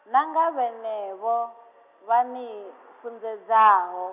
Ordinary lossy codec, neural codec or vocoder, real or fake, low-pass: none; none; real; 3.6 kHz